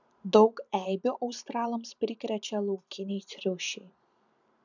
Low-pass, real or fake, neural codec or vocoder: 7.2 kHz; fake; vocoder, 44.1 kHz, 128 mel bands every 512 samples, BigVGAN v2